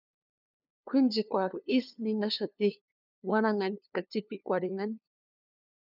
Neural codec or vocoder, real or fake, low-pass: codec, 16 kHz, 2 kbps, FunCodec, trained on LibriTTS, 25 frames a second; fake; 5.4 kHz